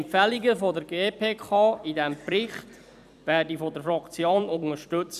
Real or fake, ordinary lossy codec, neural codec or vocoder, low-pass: fake; none; vocoder, 44.1 kHz, 128 mel bands every 256 samples, BigVGAN v2; 14.4 kHz